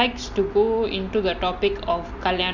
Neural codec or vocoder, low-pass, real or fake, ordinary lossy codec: none; 7.2 kHz; real; none